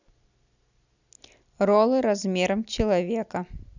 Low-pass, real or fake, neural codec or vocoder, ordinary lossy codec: 7.2 kHz; real; none; none